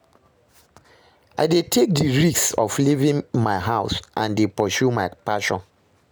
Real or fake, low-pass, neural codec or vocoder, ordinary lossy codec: real; none; none; none